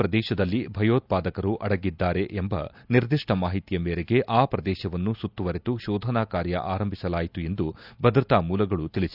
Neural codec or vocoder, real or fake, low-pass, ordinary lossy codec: none; real; 5.4 kHz; none